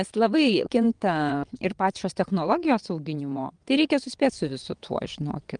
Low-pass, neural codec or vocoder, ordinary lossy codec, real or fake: 9.9 kHz; vocoder, 22.05 kHz, 80 mel bands, WaveNeXt; Opus, 32 kbps; fake